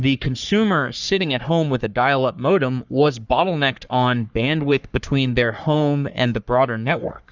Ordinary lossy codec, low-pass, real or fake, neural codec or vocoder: Opus, 64 kbps; 7.2 kHz; fake; codec, 44.1 kHz, 3.4 kbps, Pupu-Codec